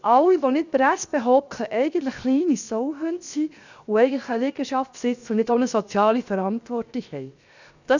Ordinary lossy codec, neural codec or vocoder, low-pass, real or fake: none; codec, 16 kHz, 0.7 kbps, FocalCodec; 7.2 kHz; fake